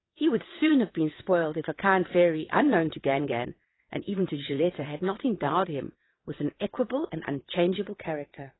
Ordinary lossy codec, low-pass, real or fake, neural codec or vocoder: AAC, 16 kbps; 7.2 kHz; fake; vocoder, 22.05 kHz, 80 mel bands, WaveNeXt